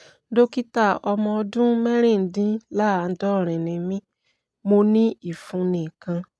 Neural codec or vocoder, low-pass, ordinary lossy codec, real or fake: none; none; none; real